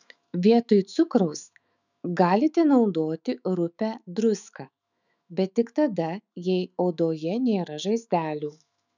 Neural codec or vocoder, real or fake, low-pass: autoencoder, 48 kHz, 128 numbers a frame, DAC-VAE, trained on Japanese speech; fake; 7.2 kHz